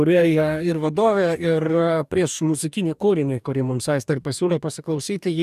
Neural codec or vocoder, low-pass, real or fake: codec, 44.1 kHz, 2.6 kbps, DAC; 14.4 kHz; fake